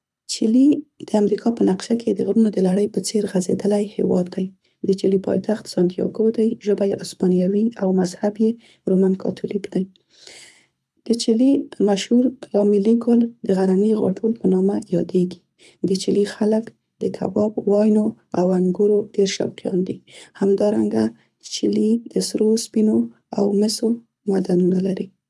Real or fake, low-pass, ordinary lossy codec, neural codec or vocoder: fake; none; none; codec, 24 kHz, 6 kbps, HILCodec